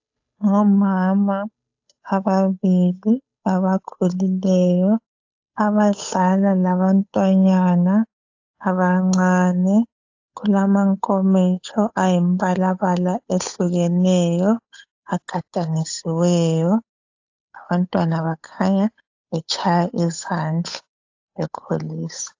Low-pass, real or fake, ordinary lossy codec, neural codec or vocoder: 7.2 kHz; fake; AAC, 48 kbps; codec, 16 kHz, 8 kbps, FunCodec, trained on Chinese and English, 25 frames a second